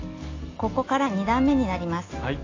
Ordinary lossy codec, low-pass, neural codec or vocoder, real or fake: AAC, 32 kbps; 7.2 kHz; none; real